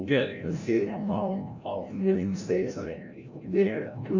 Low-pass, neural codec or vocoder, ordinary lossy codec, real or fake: 7.2 kHz; codec, 16 kHz, 0.5 kbps, FreqCodec, larger model; none; fake